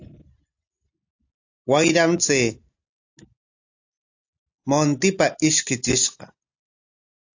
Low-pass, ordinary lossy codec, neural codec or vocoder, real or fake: 7.2 kHz; AAC, 48 kbps; none; real